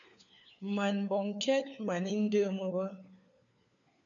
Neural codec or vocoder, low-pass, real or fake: codec, 16 kHz, 4 kbps, FunCodec, trained on LibriTTS, 50 frames a second; 7.2 kHz; fake